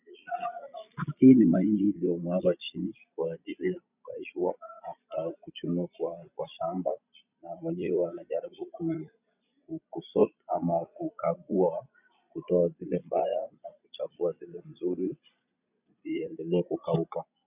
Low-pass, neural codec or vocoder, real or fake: 3.6 kHz; vocoder, 22.05 kHz, 80 mel bands, Vocos; fake